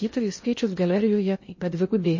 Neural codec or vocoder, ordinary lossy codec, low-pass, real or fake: codec, 16 kHz in and 24 kHz out, 0.6 kbps, FocalCodec, streaming, 2048 codes; MP3, 32 kbps; 7.2 kHz; fake